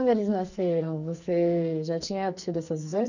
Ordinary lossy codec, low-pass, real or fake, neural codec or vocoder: none; 7.2 kHz; fake; codec, 44.1 kHz, 2.6 kbps, SNAC